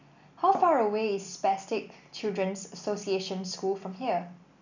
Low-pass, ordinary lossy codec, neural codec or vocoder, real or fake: 7.2 kHz; none; none; real